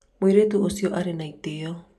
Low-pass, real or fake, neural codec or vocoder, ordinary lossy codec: 14.4 kHz; real; none; AAC, 96 kbps